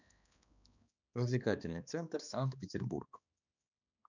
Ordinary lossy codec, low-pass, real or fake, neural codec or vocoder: none; 7.2 kHz; fake; codec, 16 kHz, 2 kbps, X-Codec, HuBERT features, trained on balanced general audio